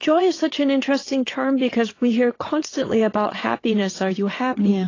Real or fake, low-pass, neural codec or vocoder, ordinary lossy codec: fake; 7.2 kHz; vocoder, 22.05 kHz, 80 mel bands, WaveNeXt; AAC, 32 kbps